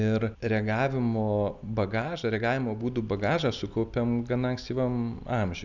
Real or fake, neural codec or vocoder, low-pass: real; none; 7.2 kHz